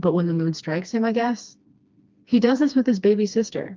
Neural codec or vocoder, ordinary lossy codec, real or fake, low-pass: codec, 16 kHz, 2 kbps, FreqCodec, smaller model; Opus, 24 kbps; fake; 7.2 kHz